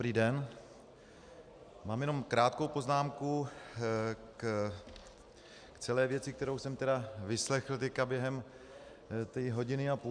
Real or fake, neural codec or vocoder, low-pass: real; none; 9.9 kHz